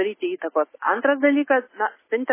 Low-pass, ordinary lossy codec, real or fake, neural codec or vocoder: 3.6 kHz; MP3, 16 kbps; real; none